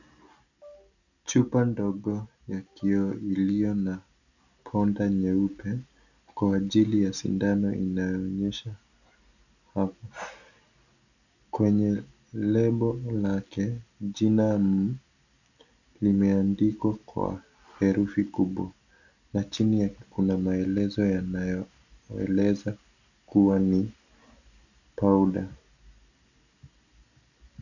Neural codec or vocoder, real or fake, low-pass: none; real; 7.2 kHz